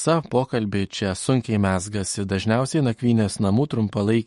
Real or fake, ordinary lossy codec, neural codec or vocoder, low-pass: fake; MP3, 48 kbps; autoencoder, 48 kHz, 128 numbers a frame, DAC-VAE, trained on Japanese speech; 19.8 kHz